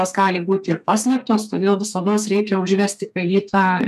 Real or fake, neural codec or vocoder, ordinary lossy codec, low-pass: fake; codec, 32 kHz, 1.9 kbps, SNAC; MP3, 96 kbps; 14.4 kHz